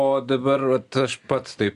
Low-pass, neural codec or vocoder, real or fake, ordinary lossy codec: 10.8 kHz; none; real; Opus, 64 kbps